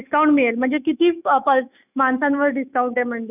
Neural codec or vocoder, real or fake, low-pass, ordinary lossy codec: autoencoder, 48 kHz, 128 numbers a frame, DAC-VAE, trained on Japanese speech; fake; 3.6 kHz; none